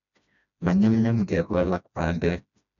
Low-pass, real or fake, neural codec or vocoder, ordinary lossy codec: 7.2 kHz; fake; codec, 16 kHz, 1 kbps, FreqCodec, smaller model; none